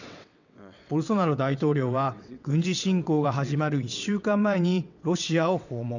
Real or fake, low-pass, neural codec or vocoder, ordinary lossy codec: fake; 7.2 kHz; vocoder, 22.05 kHz, 80 mel bands, WaveNeXt; none